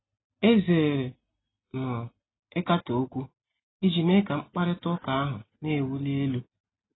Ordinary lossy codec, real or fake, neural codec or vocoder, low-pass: AAC, 16 kbps; real; none; 7.2 kHz